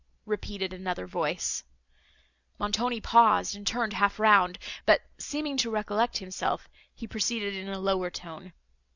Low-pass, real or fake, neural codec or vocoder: 7.2 kHz; real; none